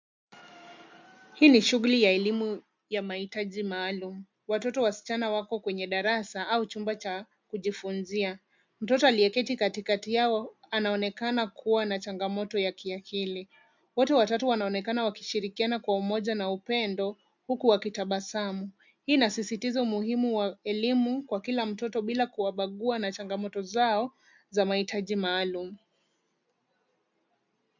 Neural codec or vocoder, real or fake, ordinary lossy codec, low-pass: none; real; MP3, 64 kbps; 7.2 kHz